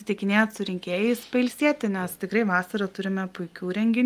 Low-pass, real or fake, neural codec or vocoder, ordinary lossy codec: 14.4 kHz; real; none; Opus, 32 kbps